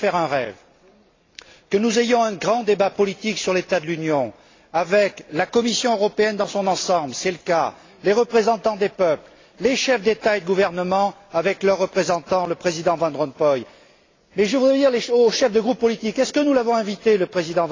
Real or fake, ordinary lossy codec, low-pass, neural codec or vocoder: real; AAC, 32 kbps; 7.2 kHz; none